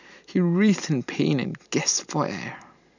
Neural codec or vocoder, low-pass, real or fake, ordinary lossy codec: none; 7.2 kHz; real; none